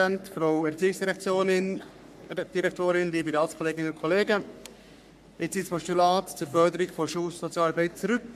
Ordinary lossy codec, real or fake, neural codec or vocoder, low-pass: MP3, 96 kbps; fake; codec, 44.1 kHz, 3.4 kbps, Pupu-Codec; 14.4 kHz